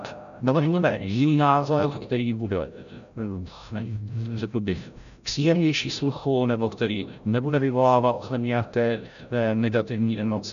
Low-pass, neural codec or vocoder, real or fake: 7.2 kHz; codec, 16 kHz, 0.5 kbps, FreqCodec, larger model; fake